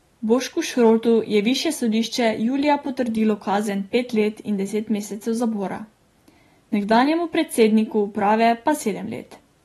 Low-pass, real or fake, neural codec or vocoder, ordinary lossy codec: 19.8 kHz; real; none; AAC, 32 kbps